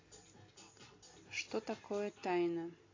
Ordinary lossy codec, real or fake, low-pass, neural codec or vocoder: AAC, 32 kbps; real; 7.2 kHz; none